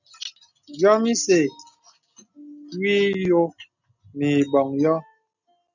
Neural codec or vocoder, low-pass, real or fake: none; 7.2 kHz; real